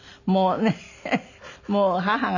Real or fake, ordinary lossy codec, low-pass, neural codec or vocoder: real; none; 7.2 kHz; none